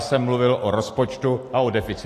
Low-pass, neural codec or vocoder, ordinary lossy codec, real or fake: 14.4 kHz; none; AAC, 64 kbps; real